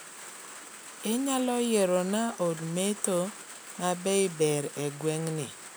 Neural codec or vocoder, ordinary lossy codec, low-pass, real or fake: none; none; none; real